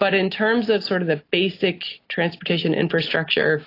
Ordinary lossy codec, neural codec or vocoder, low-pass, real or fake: AAC, 32 kbps; none; 5.4 kHz; real